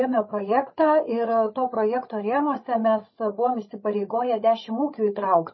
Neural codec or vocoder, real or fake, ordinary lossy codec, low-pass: codec, 16 kHz, 8 kbps, FreqCodec, larger model; fake; MP3, 24 kbps; 7.2 kHz